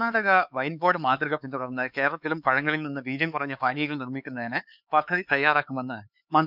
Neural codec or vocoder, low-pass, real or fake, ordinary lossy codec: codec, 16 kHz, 4 kbps, X-Codec, HuBERT features, trained on LibriSpeech; 5.4 kHz; fake; none